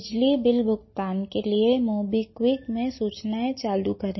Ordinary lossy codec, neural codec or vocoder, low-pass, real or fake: MP3, 24 kbps; none; 7.2 kHz; real